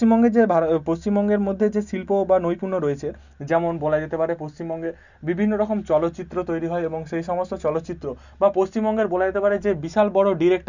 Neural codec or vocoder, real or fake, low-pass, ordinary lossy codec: none; real; 7.2 kHz; none